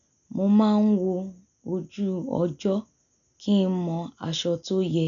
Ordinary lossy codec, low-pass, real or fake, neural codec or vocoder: none; 7.2 kHz; real; none